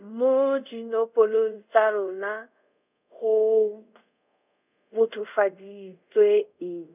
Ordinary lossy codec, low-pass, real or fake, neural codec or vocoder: none; 3.6 kHz; fake; codec, 24 kHz, 0.5 kbps, DualCodec